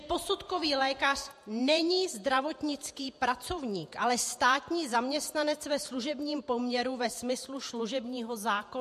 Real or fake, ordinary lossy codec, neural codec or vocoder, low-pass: fake; MP3, 64 kbps; vocoder, 48 kHz, 128 mel bands, Vocos; 14.4 kHz